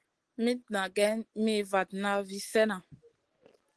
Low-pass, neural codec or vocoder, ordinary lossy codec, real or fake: 10.8 kHz; none; Opus, 16 kbps; real